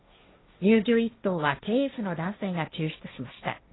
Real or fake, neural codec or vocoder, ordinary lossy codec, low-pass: fake; codec, 16 kHz, 1.1 kbps, Voila-Tokenizer; AAC, 16 kbps; 7.2 kHz